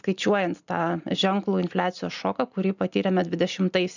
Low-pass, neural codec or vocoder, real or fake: 7.2 kHz; none; real